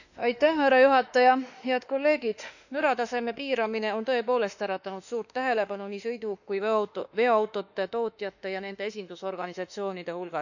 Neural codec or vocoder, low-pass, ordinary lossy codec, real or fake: autoencoder, 48 kHz, 32 numbers a frame, DAC-VAE, trained on Japanese speech; 7.2 kHz; none; fake